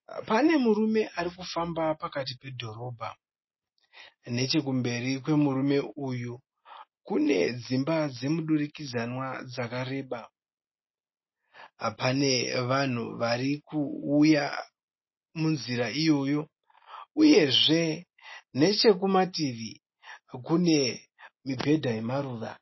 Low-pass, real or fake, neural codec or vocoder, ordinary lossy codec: 7.2 kHz; real; none; MP3, 24 kbps